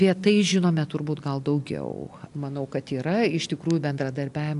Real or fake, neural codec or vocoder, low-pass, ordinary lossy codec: real; none; 10.8 kHz; AAC, 64 kbps